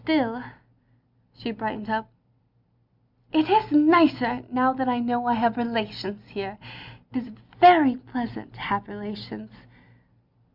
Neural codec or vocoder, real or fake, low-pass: none; real; 5.4 kHz